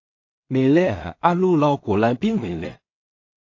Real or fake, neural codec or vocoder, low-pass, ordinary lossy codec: fake; codec, 16 kHz in and 24 kHz out, 0.4 kbps, LongCat-Audio-Codec, two codebook decoder; 7.2 kHz; AAC, 48 kbps